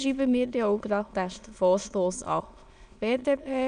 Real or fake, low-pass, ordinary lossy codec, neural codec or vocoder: fake; 9.9 kHz; none; autoencoder, 22.05 kHz, a latent of 192 numbers a frame, VITS, trained on many speakers